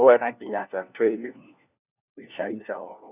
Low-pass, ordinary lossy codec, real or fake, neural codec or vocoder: 3.6 kHz; none; fake; codec, 16 kHz, 1 kbps, FunCodec, trained on LibriTTS, 50 frames a second